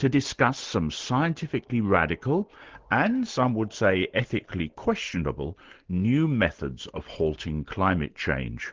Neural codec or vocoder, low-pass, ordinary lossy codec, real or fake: none; 7.2 kHz; Opus, 16 kbps; real